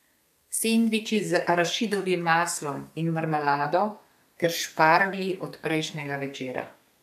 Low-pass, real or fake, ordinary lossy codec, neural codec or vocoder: 14.4 kHz; fake; none; codec, 32 kHz, 1.9 kbps, SNAC